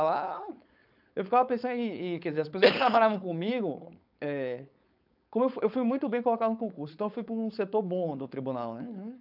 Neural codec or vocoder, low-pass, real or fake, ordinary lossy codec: codec, 16 kHz, 4.8 kbps, FACodec; 5.4 kHz; fake; none